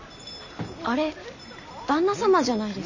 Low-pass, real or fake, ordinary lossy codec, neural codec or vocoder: 7.2 kHz; real; none; none